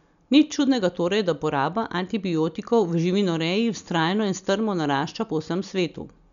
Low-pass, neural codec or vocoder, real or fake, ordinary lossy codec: 7.2 kHz; none; real; none